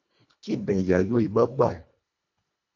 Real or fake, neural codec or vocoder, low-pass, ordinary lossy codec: fake; codec, 24 kHz, 1.5 kbps, HILCodec; 7.2 kHz; AAC, 48 kbps